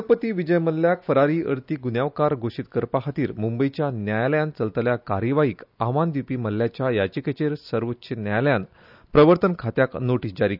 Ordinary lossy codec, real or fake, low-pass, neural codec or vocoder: none; real; 5.4 kHz; none